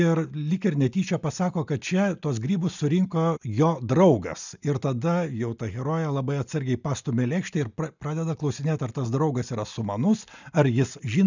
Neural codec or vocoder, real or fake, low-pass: none; real; 7.2 kHz